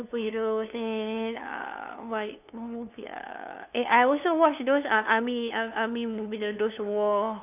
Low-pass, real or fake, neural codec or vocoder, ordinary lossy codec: 3.6 kHz; fake; codec, 16 kHz, 2 kbps, FunCodec, trained on LibriTTS, 25 frames a second; none